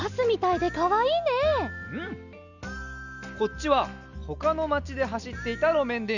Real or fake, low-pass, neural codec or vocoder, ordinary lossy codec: real; 7.2 kHz; none; none